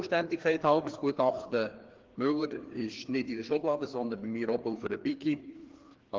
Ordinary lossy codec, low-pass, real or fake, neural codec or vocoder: Opus, 16 kbps; 7.2 kHz; fake; codec, 16 kHz, 2 kbps, FreqCodec, larger model